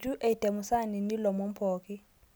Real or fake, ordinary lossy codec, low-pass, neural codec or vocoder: real; none; none; none